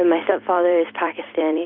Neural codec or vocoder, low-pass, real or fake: vocoder, 44.1 kHz, 128 mel bands every 256 samples, BigVGAN v2; 5.4 kHz; fake